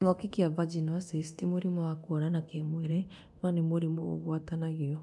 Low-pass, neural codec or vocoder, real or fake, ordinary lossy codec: none; codec, 24 kHz, 0.9 kbps, DualCodec; fake; none